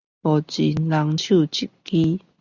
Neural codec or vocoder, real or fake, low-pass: none; real; 7.2 kHz